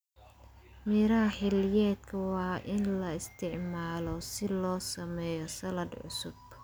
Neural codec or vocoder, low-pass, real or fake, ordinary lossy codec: none; none; real; none